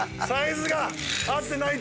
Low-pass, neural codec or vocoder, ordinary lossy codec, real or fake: none; none; none; real